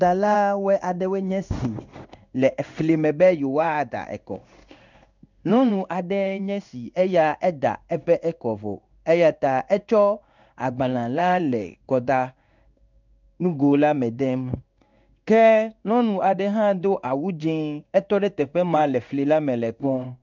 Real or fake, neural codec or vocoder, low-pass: fake; codec, 16 kHz in and 24 kHz out, 1 kbps, XY-Tokenizer; 7.2 kHz